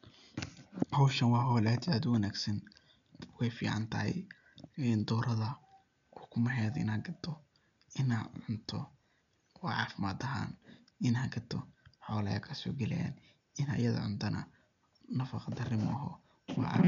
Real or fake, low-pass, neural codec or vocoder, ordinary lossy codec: real; 7.2 kHz; none; none